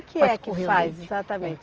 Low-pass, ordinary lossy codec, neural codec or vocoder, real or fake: 7.2 kHz; Opus, 24 kbps; none; real